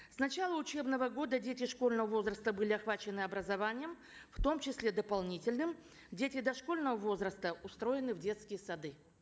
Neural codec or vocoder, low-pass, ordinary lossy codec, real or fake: none; none; none; real